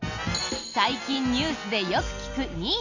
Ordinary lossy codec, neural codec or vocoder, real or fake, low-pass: none; none; real; 7.2 kHz